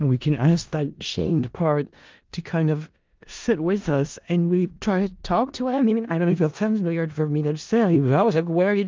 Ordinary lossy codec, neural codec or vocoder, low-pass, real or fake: Opus, 32 kbps; codec, 16 kHz in and 24 kHz out, 0.4 kbps, LongCat-Audio-Codec, four codebook decoder; 7.2 kHz; fake